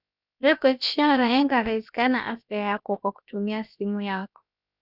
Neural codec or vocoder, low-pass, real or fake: codec, 16 kHz, about 1 kbps, DyCAST, with the encoder's durations; 5.4 kHz; fake